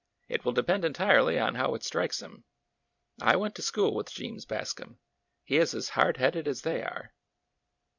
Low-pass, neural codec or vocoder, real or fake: 7.2 kHz; vocoder, 44.1 kHz, 128 mel bands every 256 samples, BigVGAN v2; fake